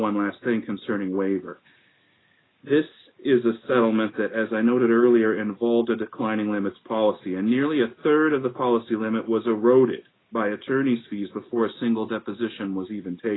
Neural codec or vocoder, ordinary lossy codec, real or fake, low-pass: none; AAC, 16 kbps; real; 7.2 kHz